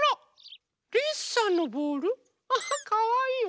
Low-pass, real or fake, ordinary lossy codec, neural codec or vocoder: none; real; none; none